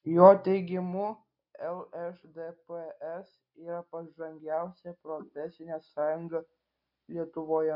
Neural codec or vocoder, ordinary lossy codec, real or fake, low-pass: none; MP3, 48 kbps; real; 5.4 kHz